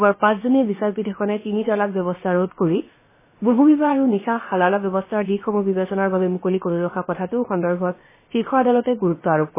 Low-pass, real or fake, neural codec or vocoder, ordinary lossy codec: 3.6 kHz; fake; codec, 16 kHz, about 1 kbps, DyCAST, with the encoder's durations; MP3, 16 kbps